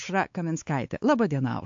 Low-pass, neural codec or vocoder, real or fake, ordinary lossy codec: 7.2 kHz; codec, 16 kHz, 4 kbps, X-Codec, WavLM features, trained on Multilingual LibriSpeech; fake; AAC, 64 kbps